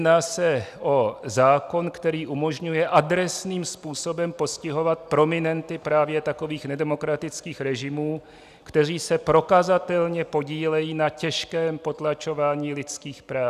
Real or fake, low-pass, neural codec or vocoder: real; 14.4 kHz; none